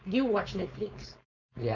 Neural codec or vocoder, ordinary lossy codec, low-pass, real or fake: codec, 16 kHz, 4.8 kbps, FACodec; none; 7.2 kHz; fake